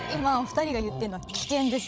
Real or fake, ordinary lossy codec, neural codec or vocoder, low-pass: fake; none; codec, 16 kHz, 16 kbps, FreqCodec, larger model; none